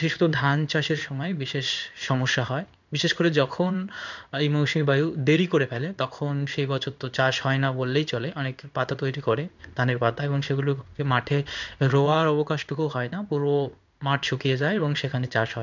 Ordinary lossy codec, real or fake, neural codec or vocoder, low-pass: none; fake; codec, 16 kHz in and 24 kHz out, 1 kbps, XY-Tokenizer; 7.2 kHz